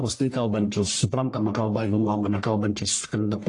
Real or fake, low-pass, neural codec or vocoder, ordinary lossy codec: fake; 10.8 kHz; codec, 44.1 kHz, 1.7 kbps, Pupu-Codec; AAC, 48 kbps